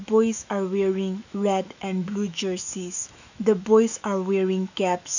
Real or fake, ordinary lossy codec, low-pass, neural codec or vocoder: fake; none; 7.2 kHz; autoencoder, 48 kHz, 128 numbers a frame, DAC-VAE, trained on Japanese speech